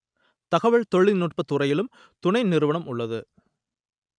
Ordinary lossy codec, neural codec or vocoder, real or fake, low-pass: none; none; real; 9.9 kHz